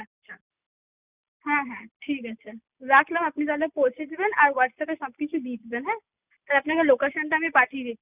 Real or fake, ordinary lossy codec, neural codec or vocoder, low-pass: real; none; none; 3.6 kHz